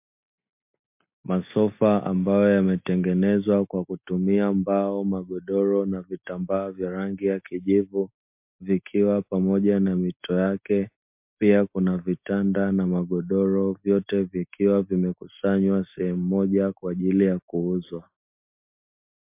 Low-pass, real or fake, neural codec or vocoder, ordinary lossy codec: 3.6 kHz; real; none; MP3, 32 kbps